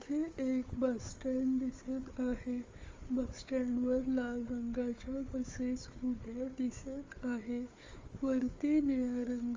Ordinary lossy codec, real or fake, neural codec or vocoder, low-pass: Opus, 32 kbps; fake; codec, 16 kHz, 4 kbps, FunCodec, trained on Chinese and English, 50 frames a second; 7.2 kHz